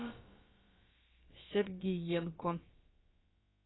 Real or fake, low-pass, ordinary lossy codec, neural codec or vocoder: fake; 7.2 kHz; AAC, 16 kbps; codec, 16 kHz, about 1 kbps, DyCAST, with the encoder's durations